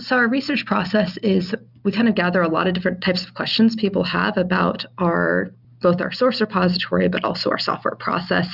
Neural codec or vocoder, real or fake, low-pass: none; real; 5.4 kHz